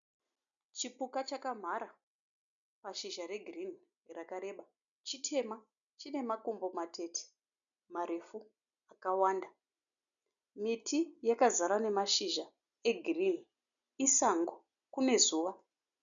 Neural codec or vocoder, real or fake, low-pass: none; real; 7.2 kHz